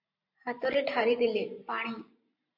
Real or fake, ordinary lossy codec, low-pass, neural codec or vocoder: fake; MP3, 32 kbps; 5.4 kHz; vocoder, 44.1 kHz, 128 mel bands every 512 samples, BigVGAN v2